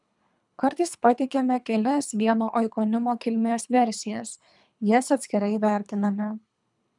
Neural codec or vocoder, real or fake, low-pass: codec, 24 kHz, 3 kbps, HILCodec; fake; 10.8 kHz